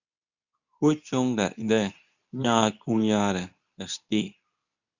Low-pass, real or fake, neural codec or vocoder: 7.2 kHz; fake; codec, 24 kHz, 0.9 kbps, WavTokenizer, medium speech release version 2